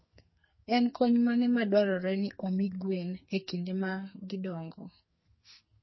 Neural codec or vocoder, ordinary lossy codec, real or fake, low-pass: codec, 44.1 kHz, 2.6 kbps, SNAC; MP3, 24 kbps; fake; 7.2 kHz